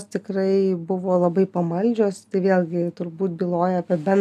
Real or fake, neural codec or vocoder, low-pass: real; none; 14.4 kHz